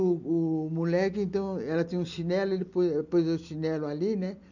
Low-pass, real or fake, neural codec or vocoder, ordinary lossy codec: 7.2 kHz; real; none; none